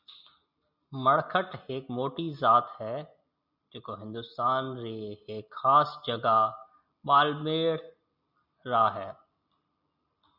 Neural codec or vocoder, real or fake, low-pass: none; real; 5.4 kHz